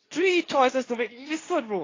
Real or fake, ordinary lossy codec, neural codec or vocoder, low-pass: fake; AAC, 32 kbps; codec, 24 kHz, 0.9 kbps, WavTokenizer, medium speech release version 2; 7.2 kHz